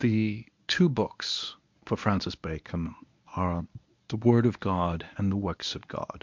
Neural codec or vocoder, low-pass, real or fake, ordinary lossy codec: codec, 24 kHz, 0.9 kbps, WavTokenizer, medium speech release version 1; 7.2 kHz; fake; MP3, 64 kbps